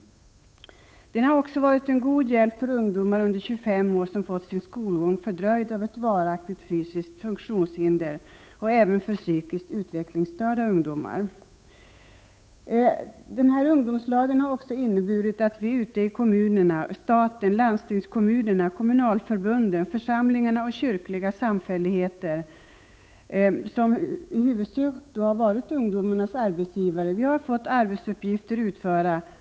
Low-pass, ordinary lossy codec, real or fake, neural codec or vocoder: none; none; fake; codec, 16 kHz, 8 kbps, FunCodec, trained on Chinese and English, 25 frames a second